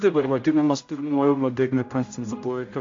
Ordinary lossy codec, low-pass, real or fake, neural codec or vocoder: AAC, 48 kbps; 7.2 kHz; fake; codec, 16 kHz, 0.5 kbps, X-Codec, HuBERT features, trained on general audio